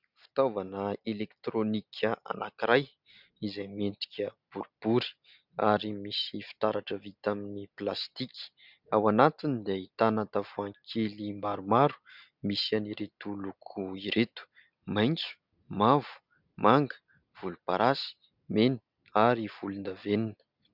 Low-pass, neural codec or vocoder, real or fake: 5.4 kHz; none; real